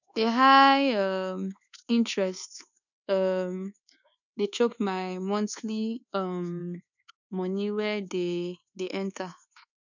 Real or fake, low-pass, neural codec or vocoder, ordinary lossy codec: fake; 7.2 kHz; codec, 24 kHz, 1.2 kbps, DualCodec; none